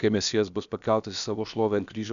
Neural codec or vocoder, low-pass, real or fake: codec, 16 kHz, about 1 kbps, DyCAST, with the encoder's durations; 7.2 kHz; fake